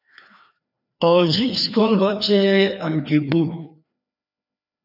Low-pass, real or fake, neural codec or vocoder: 5.4 kHz; fake; codec, 16 kHz, 2 kbps, FreqCodec, larger model